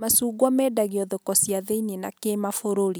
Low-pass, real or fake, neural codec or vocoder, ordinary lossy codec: none; real; none; none